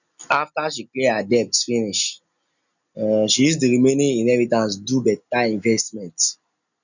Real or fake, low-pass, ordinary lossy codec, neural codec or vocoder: real; 7.2 kHz; none; none